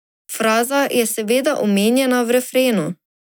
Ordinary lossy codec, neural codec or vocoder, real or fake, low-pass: none; none; real; none